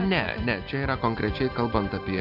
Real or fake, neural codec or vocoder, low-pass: real; none; 5.4 kHz